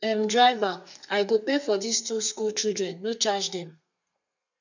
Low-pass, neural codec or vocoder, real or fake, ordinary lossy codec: 7.2 kHz; codec, 32 kHz, 1.9 kbps, SNAC; fake; none